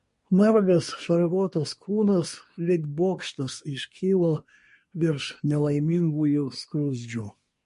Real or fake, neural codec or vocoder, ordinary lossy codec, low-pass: fake; codec, 24 kHz, 1 kbps, SNAC; MP3, 48 kbps; 10.8 kHz